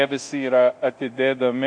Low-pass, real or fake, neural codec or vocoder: 9.9 kHz; fake; codec, 24 kHz, 0.9 kbps, DualCodec